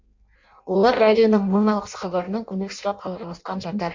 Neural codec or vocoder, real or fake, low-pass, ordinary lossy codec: codec, 16 kHz in and 24 kHz out, 0.6 kbps, FireRedTTS-2 codec; fake; 7.2 kHz; MP3, 48 kbps